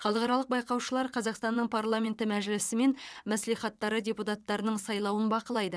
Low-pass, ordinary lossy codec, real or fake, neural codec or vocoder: none; none; fake; vocoder, 22.05 kHz, 80 mel bands, WaveNeXt